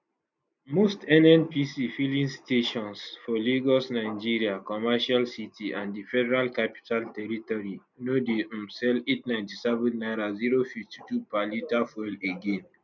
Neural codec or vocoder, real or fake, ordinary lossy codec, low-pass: none; real; none; 7.2 kHz